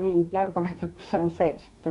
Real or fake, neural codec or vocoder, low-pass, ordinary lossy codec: fake; codec, 24 kHz, 1 kbps, SNAC; 10.8 kHz; none